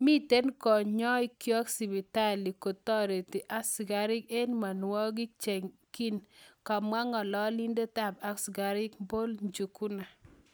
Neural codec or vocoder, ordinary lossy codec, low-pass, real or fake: none; none; none; real